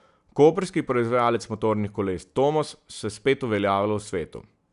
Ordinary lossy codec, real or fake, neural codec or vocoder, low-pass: none; real; none; 10.8 kHz